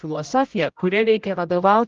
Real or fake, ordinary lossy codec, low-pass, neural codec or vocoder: fake; Opus, 32 kbps; 7.2 kHz; codec, 16 kHz, 0.5 kbps, X-Codec, HuBERT features, trained on general audio